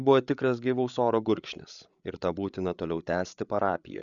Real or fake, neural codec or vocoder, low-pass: fake; codec, 16 kHz, 8 kbps, FreqCodec, larger model; 7.2 kHz